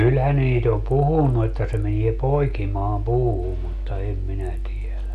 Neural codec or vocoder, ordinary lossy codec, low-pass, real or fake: none; none; 14.4 kHz; real